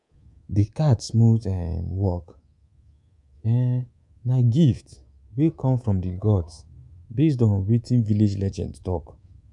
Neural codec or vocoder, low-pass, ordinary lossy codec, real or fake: codec, 24 kHz, 3.1 kbps, DualCodec; 10.8 kHz; none; fake